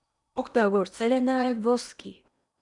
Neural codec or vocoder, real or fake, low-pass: codec, 16 kHz in and 24 kHz out, 0.8 kbps, FocalCodec, streaming, 65536 codes; fake; 10.8 kHz